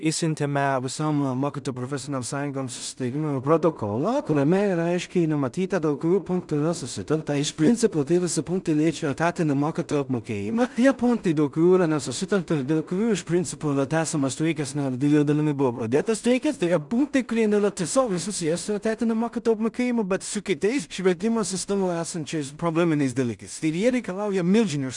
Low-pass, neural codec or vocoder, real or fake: 10.8 kHz; codec, 16 kHz in and 24 kHz out, 0.4 kbps, LongCat-Audio-Codec, two codebook decoder; fake